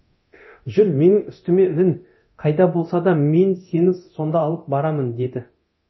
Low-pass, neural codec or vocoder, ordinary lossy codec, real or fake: 7.2 kHz; codec, 24 kHz, 0.9 kbps, DualCodec; MP3, 24 kbps; fake